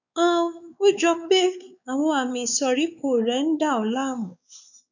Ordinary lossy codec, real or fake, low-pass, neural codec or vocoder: none; fake; 7.2 kHz; codec, 16 kHz, 4 kbps, X-Codec, WavLM features, trained on Multilingual LibriSpeech